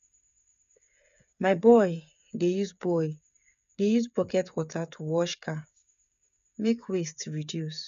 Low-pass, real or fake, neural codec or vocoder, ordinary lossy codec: 7.2 kHz; fake; codec, 16 kHz, 8 kbps, FreqCodec, smaller model; none